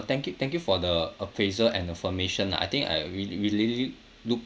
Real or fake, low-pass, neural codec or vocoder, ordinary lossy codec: real; none; none; none